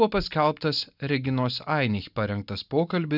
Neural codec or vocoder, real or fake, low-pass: none; real; 5.4 kHz